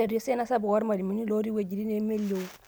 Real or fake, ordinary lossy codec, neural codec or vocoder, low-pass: real; none; none; none